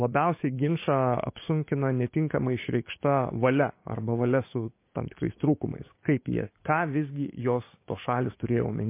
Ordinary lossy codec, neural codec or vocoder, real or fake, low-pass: MP3, 24 kbps; codec, 16 kHz, 6 kbps, DAC; fake; 3.6 kHz